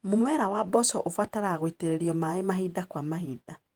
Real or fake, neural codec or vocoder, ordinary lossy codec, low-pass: fake; vocoder, 48 kHz, 128 mel bands, Vocos; Opus, 24 kbps; 19.8 kHz